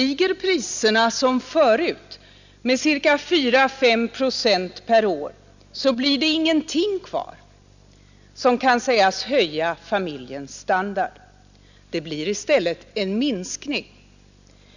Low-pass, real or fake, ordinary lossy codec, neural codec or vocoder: 7.2 kHz; real; none; none